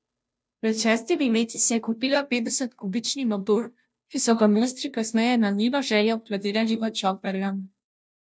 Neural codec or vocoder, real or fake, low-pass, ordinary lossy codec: codec, 16 kHz, 0.5 kbps, FunCodec, trained on Chinese and English, 25 frames a second; fake; none; none